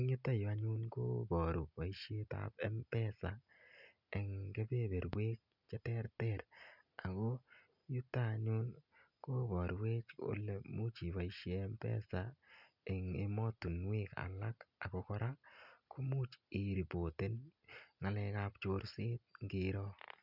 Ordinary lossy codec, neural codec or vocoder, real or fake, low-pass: none; none; real; 5.4 kHz